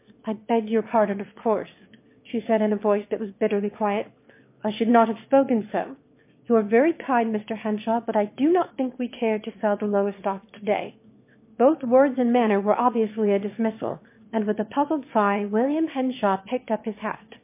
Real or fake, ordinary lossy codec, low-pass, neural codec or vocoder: fake; MP3, 24 kbps; 3.6 kHz; autoencoder, 22.05 kHz, a latent of 192 numbers a frame, VITS, trained on one speaker